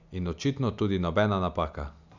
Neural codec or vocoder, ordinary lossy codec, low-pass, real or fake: none; none; 7.2 kHz; real